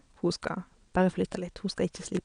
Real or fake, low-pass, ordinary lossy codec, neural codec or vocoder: fake; 9.9 kHz; none; vocoder, 22.05 kHz, 80 mel bands, WaveNeXt